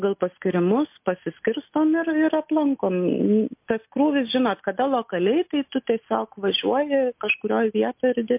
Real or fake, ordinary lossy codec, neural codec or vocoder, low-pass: real; MP3, 32 kbps; none; 3.6 kHz